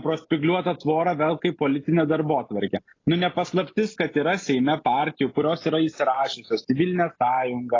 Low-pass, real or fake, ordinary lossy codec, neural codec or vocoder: 7.2 kHz; real; AAC, 32 kbps; none